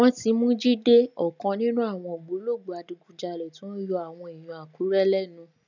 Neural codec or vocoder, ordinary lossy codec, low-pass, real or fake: none; none; 7.2 kHz; real